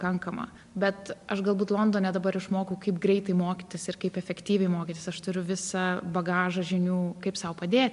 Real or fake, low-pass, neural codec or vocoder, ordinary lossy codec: real; 10.8 kHz; none; MP3, 64 kbps